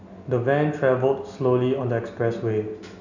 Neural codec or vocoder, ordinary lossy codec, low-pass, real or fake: none; none; 7.2 kHz; real